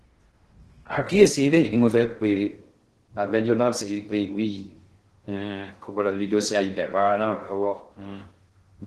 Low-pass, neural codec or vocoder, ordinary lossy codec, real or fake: 10.8 kHz; codec, 16 kHz in and 24 kHz out, 0.6 kbps, FocalCodec, streaming, 2048 codes; Opus, 16 kbps; fake